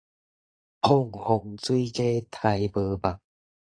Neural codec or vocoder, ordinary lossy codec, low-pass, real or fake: vocoder, 22.05 kHz, 80 mel bands, Vocos; AAC, 48 kbps; 9.9 kHz; fake